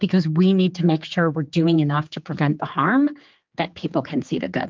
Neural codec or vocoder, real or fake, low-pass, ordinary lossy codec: codec, 16 kHz, 4 kbps, X-Codec, HuBERT features, trained on general audio; fake; 7.2 kHz; Opus, 24 kbps